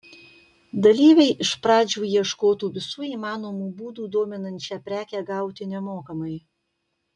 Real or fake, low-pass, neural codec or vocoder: real; 10.8 kHz; none